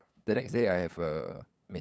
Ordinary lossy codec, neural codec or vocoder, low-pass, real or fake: none; codec, 16 kHz, 8 kbps, FunCodec, trained on LibriTTS, 25 frames a second; none; fake